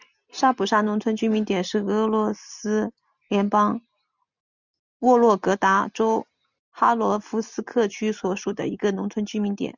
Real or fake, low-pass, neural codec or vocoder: real; 7.2 kHz; none